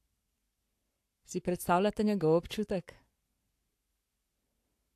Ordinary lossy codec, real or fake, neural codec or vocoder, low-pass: AAC, 64 kbps; fake; codec, 44.1 kHz, 7.8 kbps, Pupu-Codec; 14.4 kHz